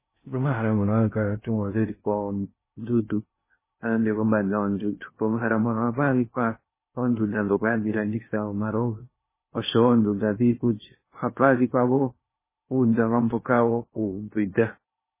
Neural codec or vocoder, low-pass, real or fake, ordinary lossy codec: codec, 16 kHz in and 24 kHz out, 0.6 kbps, FocalCodec, streaming, 4096 codes; 3.6 kHz; fake; MP3, 16 kbps